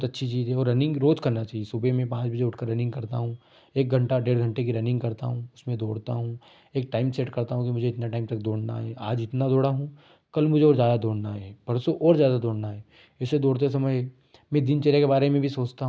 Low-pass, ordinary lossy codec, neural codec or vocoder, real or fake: none; none; none; real